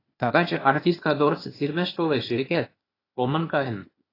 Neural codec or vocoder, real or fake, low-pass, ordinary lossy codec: codec, 16 kHz, 0.8 kbps, ZipCodec; fake; 5.4 kHz; AAC, 24 kbps